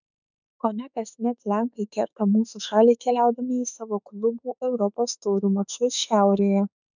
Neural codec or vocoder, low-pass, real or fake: autoencoder, 48 kHz, 32 numbers a frame, DAC-VAE, trained on Japanese speech; 7.2 kHz; fake